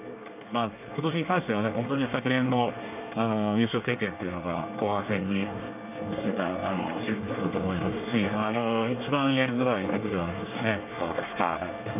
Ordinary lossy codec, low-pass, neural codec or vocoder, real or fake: none; 3.6 kHz; codec, 24 kHz, 1 kbps, SNAC; fake